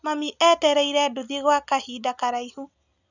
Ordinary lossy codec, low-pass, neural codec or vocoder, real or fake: none; 7.2 kHz; none; real